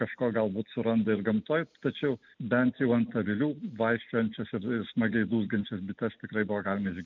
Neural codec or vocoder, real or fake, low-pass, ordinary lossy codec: none; real; 7.2 kHz; MP3, 64 kbps